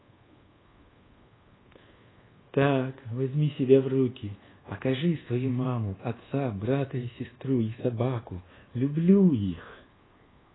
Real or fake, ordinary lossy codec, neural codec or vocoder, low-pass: fake; AAC, 16 kbps; codec, 24 kHz, 1.2 kbps, DualCodec; 7.2 kHz